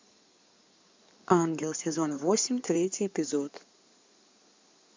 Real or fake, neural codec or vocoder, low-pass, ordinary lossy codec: fake; codec, 16 kHz in and 24 kHz out, 2.2 kbps, FireRedTTS-2 codec; 7.2 kHz; MP3, 64 kbps